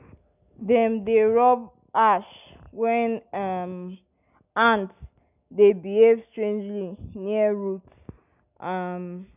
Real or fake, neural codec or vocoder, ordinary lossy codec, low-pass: real; none; none; 3.6 kHz